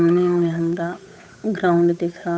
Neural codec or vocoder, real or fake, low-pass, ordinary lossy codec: codec, 16 kHz, 8 kbps, FunCodec, trained on Chinese and English, 25 frames a second; fake; none; none